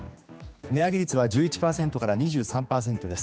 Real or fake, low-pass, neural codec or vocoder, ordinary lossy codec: fake; none; codec, 16 kHz, 2 kbps, X-Codec, HuBERT features, trained on general audio; none